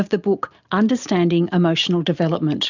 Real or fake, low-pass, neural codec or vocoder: real; 7.2 kHz; none